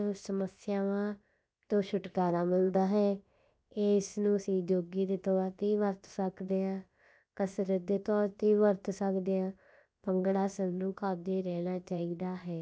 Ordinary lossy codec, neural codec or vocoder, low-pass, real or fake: none; codec, 16 kHz, about 1 kbps, DyCAST, with the encoder's durations; none; fake